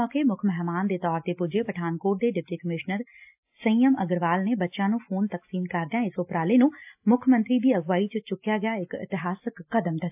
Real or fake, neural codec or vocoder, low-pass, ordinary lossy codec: real; none; 3.6 kHz; AAC, 32 kbps